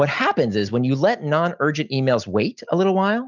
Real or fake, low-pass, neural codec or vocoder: real; 7.2 kHz; none